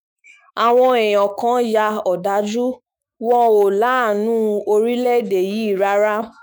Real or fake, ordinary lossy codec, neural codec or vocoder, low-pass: fake; none; autoencoder, 48 kHz, 128 numbers a frame, DAC-VAE, trained on Japanese speech; none